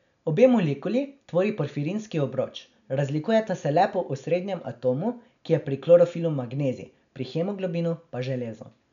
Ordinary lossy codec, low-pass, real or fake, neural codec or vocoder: none; 7.2 kHz; real; none